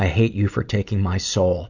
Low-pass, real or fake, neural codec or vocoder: 7.2 kHz; real; none